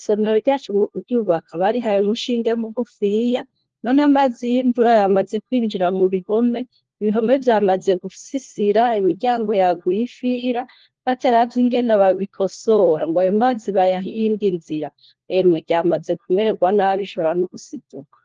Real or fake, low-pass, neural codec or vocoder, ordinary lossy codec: fake; 7.2 kHz; codec, 16 kHz, 1 kbps, FunCodec, trained on LibriTTS, 50 frames a second; Opus, 16 kbps